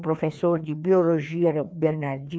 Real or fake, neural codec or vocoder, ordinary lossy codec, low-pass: fake; codec, 16 kHz, 2 kbps, FreqCodec, larger model; none; none